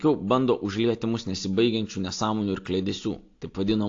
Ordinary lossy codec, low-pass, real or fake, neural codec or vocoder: AAC, 48 kbps; 7.2 kHz; real; none